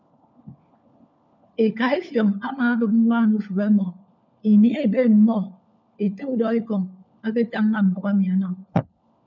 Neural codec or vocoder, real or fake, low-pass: codec, 16 kHz, 4 kbps, FunCodec, trained on LibriTTS, 50 frames a second; fake; 7.2 kHz